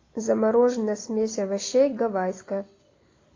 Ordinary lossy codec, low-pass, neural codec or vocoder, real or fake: AAC, 32 kbps; 7.2 kHz; none; real